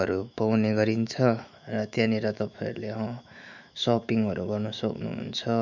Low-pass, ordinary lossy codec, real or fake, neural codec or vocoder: 7.2 kHz; none; fake; autoencoder, 48 kHz, 128 numbers a frame, DAC-VAE, trained on Japanese speech